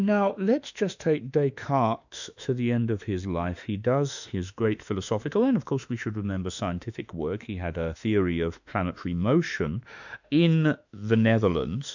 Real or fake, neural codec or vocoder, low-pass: fake; autoencoder, 48 kHz, 32 numbers a frame, DAC-VAE, trained on Japanese speech; 7.2 kHz